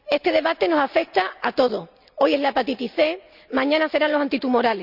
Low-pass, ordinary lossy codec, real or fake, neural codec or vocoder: 5.4 kHz; none; real; none